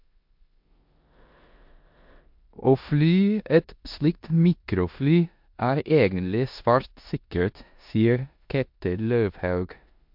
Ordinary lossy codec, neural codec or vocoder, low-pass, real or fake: MP3, 48 kbps; codec, 16 kHz in and 24 kHz out, 0.9 kbps, LongCat-Audio-Codec, four codebook decoder; 5.4 kHz; fake